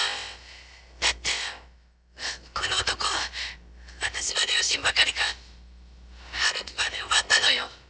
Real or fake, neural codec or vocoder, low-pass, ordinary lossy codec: fake; codec, 16 kHz, about 1 kbps, DyCAST, with the encoder's durations; none; none